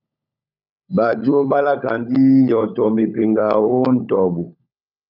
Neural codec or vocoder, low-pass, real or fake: codec, 16 kHz, 16 kbps, FunCodec, trained on LibriTTS, 50 frames a second; 5.4 kHz; fake